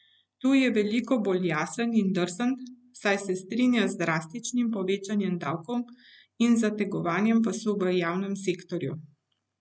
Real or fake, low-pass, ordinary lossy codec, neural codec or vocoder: real; none; none; none